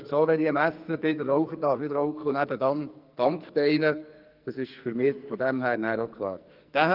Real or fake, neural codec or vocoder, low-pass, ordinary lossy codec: fake; codec, 44.1 kHz, 2.6 kbps, SNAC; 5.4 kHz; Opus, 24 kbps